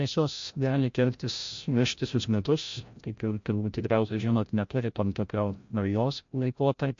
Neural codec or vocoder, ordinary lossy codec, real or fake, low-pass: codec, 16 kHz, 0.5 kbps, FreqCodec, larger model; MP3, 48 kbps; fake; 7.2 kHz